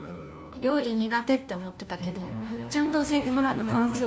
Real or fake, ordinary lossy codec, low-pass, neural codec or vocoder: fake; none; none; codec, 16 kHz, 1 kbps, FunCodec, trained on LibriTTS, 50 frames a second